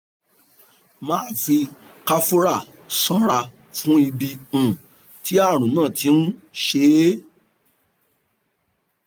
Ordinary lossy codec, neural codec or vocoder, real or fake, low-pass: none; none; real; none